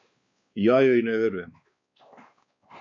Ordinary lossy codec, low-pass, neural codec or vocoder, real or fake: MP3, 48 kbps; 7.2 kHz; codec, 16 kHz, 2 kbps, X-Codec, WavLM features, trained on Multilingual LibriSpeech; fake